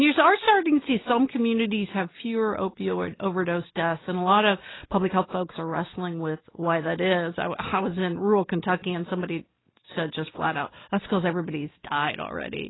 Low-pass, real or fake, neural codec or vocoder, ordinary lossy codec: 7.2 kHz; real; none; AAC, 16 kbps